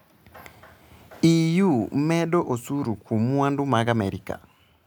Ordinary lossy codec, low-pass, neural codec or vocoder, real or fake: none; none; none; real